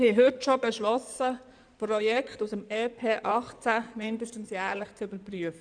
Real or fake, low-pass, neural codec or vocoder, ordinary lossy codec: fake; 9.9 kHz; codec, 16 kHz in and 24 kHz out, 2.2 kbps, FireRedTTS-2 codec; none